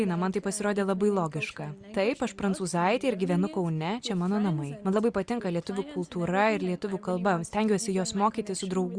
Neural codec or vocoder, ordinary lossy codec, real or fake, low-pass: none; Opus, 64 kbps; real; 9.9 kHz